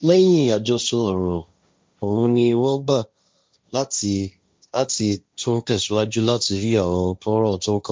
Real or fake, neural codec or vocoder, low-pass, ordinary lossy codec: fake; codec, 16 kHz, 1.1 kbps, Voila-Tokenizer; none; none